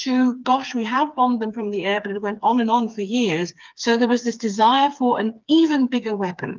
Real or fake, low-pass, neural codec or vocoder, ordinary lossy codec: fake; 7.2 kHz; codec, 16 kHz, 4 kbps, FreqCodec, smaller model; Opus, 24 kbps